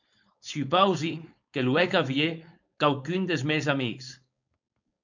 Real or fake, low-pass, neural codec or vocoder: fake; 7.2 kHz; codec, 16 kHz, 4.8 kbps, FACodec